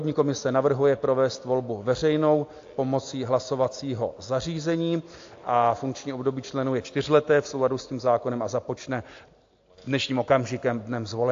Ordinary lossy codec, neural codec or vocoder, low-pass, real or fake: AAC, 48 kbps; none; 7.2 kHz; real